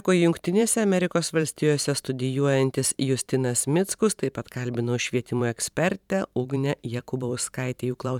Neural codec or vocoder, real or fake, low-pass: vocoder, 44.1 kHz, 128 mel bands every 512 samples, BigVGAN v2; fake; 19.8 kHz